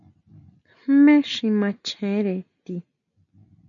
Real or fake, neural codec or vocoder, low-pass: real; none; 7.2 kHz